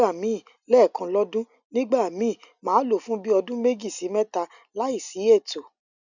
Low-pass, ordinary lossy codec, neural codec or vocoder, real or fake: 7.2 kHz; none; none; real